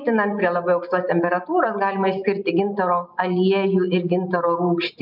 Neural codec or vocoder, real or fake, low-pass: none; real; 5.4 kHz